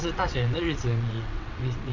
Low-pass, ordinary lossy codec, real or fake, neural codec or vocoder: 7.2 kHz; none; fake; vocoder, 22.05 kHz, 80 mel bands, WaveNeXt